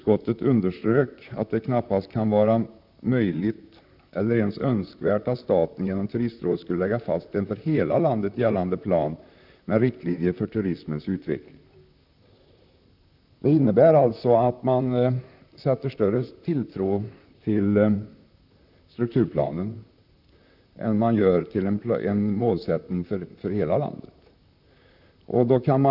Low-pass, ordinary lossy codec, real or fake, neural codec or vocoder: 5.4 kHz; none; fake; vocoder, 44.1 kHz, 128 mel bands, Pupu-Vocoder